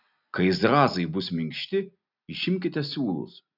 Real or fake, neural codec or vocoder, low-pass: real; none; 5.4 kHz